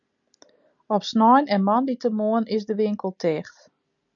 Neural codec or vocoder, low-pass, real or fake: none; 7.2 kHz; real